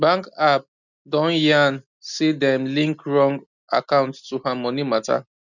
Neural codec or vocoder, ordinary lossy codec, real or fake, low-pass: none; none; real; 7.2 kHz